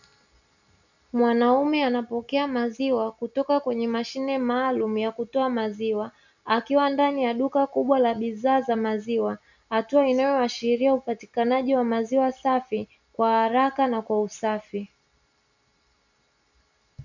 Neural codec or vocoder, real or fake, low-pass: none; real; 7.2 kHz